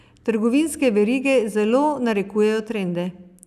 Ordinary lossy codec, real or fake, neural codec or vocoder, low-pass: none; real; none; 14.4 kHz